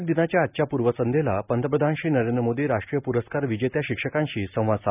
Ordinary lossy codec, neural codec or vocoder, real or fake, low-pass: none; none; real; 3.6 kHz